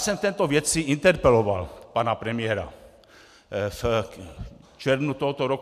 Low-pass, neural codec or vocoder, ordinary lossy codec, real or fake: 14.4 kHz; none; MP3, 96 kbps; real